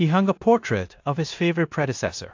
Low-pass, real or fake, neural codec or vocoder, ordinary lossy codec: 7.2 kHz; fake; codec, 16 kHz in and 24 kHz out, 0.9 kbps, LongCat-Audio-Codec, four codebook decoder; AAC, 48 kbps